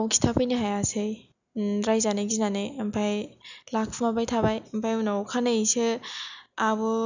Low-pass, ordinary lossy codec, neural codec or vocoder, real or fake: 7.2 kHz; none; none; real